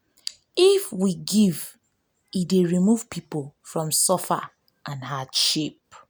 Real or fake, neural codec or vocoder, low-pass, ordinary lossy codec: real; none; none; none